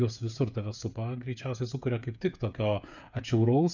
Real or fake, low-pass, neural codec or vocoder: fake; 7.2 kHz; codec, 16 kHz, 8 kbps, FreqCodec, smaller model